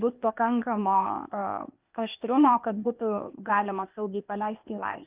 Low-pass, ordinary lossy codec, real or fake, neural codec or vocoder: 3.6 kHz; Opus, 24 kbps; fake; codec, 16 kHz, 0.8 kbps, ZipCodec